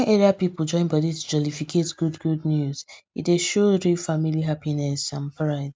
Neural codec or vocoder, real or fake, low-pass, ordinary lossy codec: none; real; none; none